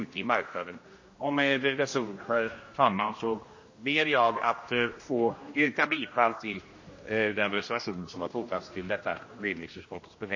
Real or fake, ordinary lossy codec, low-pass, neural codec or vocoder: fake; MP3, 32 kbps; 7.2 kHz; codec, 16 kHz, 1 kbps, X-Codec, HuBERT features, trained on general audio